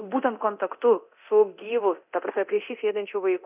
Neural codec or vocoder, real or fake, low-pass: codec, 24 kHz, 0.9 kbps, DualCodec; fake; 3.6 kHz